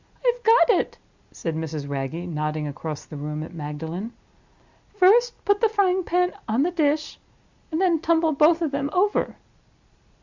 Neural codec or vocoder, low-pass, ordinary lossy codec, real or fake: none; 7.2 kHz; Opus, 64 kbps; real